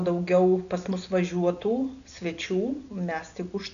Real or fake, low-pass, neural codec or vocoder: real; 7.2 kHz; none